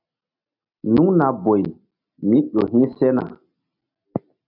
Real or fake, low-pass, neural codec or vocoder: real; 5.4 kHz; none